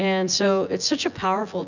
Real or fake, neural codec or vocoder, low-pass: fake; vocoder, 24 kHz, 100 mel bands, Vocos; 7.2 kHz